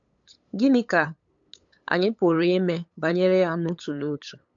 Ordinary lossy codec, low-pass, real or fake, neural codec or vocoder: AAC, 64 kbps; 7.2 kHz; fake; codec, 16 kHz, 8 kbps, FunCodec, trained on LibriTTS, 25 frames a second